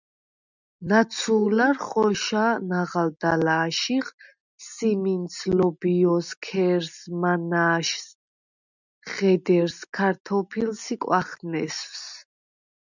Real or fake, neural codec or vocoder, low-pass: real; none; 7.2 kHz